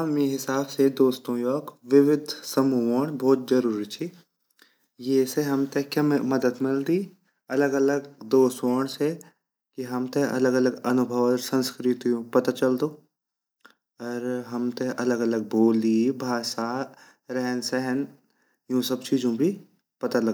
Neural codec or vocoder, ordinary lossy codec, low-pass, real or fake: none; none; none; real